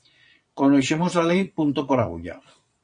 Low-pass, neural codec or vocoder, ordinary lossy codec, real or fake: 9.9 kHz; vocoder, 22.05 kHz, 80 mel bands, Vocos; MP3, 48 kbps; fake